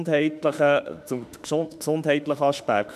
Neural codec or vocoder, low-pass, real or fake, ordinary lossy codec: autoencoder, 48 kHz, 32 numbers a frame, DAC-VAE, trained on Japanese speech; 14.4 kHz; fake; none